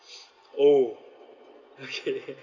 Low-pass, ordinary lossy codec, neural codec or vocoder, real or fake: 7.2 kHz; none; none; real